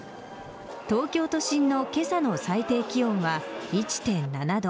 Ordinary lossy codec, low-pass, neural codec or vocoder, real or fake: none; none; none; real